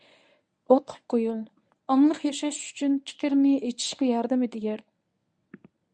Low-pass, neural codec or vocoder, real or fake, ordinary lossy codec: 9.9 kHz; codec, 24 kHz, 0.9 kbps, WavTokenizer, medium speech release version 1; fake; Opus, 64 kbps